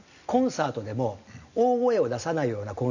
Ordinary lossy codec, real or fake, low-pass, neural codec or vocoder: none; real; 7.2 kHz; none